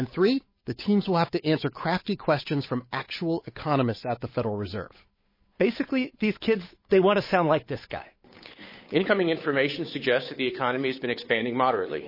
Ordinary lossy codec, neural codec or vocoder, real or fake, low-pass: MP3, 24 kbps; codec, 16 kHz, 8 kbps, FunCodec, trained on Chinese and English, 25 frames a second; fake; 5.4 kHz